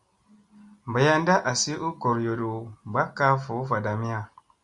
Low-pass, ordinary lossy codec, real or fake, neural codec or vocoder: 10.8 kHz; Opus, 64 kbps; real; none